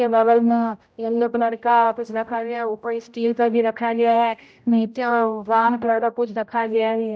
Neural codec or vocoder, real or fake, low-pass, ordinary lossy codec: codec, 16 kHz, 0.5 kbps, X-Codec, HuBERT features, trained on general audio; fake; none; none